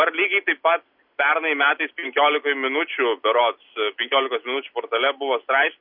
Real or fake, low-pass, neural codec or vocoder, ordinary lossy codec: real; 5.4 kHz; none; MP3, 48 kbps